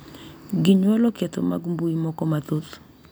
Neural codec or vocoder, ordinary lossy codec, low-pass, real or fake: none; none; none; real